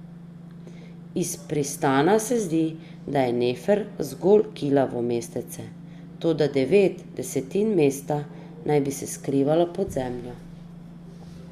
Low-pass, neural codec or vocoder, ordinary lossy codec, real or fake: 14.4 kHz; none; Opus, 64 kbps; real